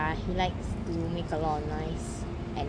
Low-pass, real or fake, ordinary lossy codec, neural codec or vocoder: 9.9 kHz; real; AAC, 48 kbps; none